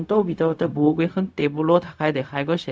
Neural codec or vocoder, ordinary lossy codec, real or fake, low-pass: codec, 16 kHz, 0.4 kbps, LongCat-Audio-Codec; none; fake; none